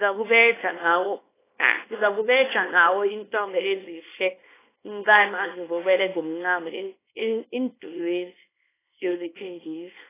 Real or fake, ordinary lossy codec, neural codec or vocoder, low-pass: fake; AAC, 16 kbps; codec, 24 kHz, 0.9 kbps, WavTokenizer, small release; 3.6 kHz